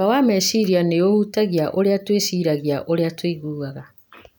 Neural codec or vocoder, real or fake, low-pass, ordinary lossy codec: none; real; none; none